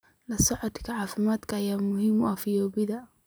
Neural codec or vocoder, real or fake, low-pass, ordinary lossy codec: none; real; none; none